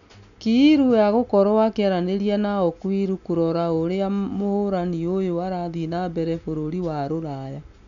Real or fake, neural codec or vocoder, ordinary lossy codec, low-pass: real; none; none; 7.2 kHz